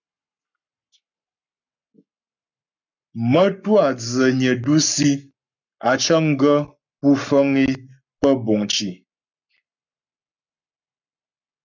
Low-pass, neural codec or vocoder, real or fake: 7.2 kHz; codec, 44.1 kHz, 7.8 kbps, Pupu-Codec; fake